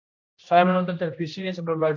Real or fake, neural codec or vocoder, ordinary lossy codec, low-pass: fake; codec, 16 kHz, 1 kbps, X-Codec, HuBERT features, trained on general audio; AAC, 48 kbps; 7.2 kHz